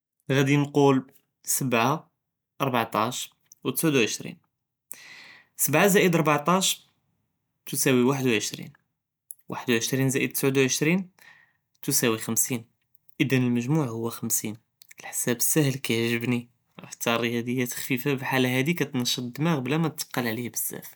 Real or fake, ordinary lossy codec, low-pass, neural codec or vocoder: real; none; none; none